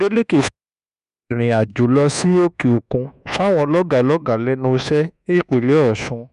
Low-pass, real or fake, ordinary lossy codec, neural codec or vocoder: 10.8 kHz; fake; none; codec, 24 kHz, 1.2 kbps, DualCodec